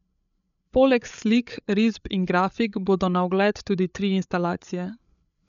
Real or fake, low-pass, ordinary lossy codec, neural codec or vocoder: fake; 7.2 kHz; none; codec, 16 kHz, 8 kbps, FreqCodec, larger model